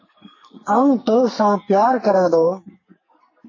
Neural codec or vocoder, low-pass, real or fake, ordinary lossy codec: codec, 44.1 kHz, 2.6 kbps, SNAC; 7.2 kHz; fake; MP3, 32 kbps